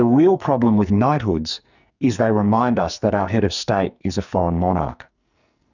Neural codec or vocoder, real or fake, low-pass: codec, 44.1 kHz, 2.6 kbps, SNAC; fake; 7.2 kHz